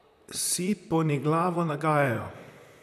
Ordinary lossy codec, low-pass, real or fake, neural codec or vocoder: none; 14.4 kHz; fake; vocoder, 44.1 kHz, 128 mel bands, Pupu-Vocoder